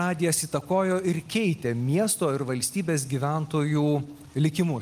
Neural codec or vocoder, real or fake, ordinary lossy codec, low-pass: none; real; Opus, 32 kbps; 14.4 kHz